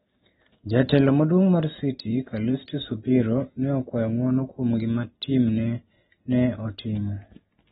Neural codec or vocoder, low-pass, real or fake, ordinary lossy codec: none; 7.2 kHz; real; AAC, 16 kbps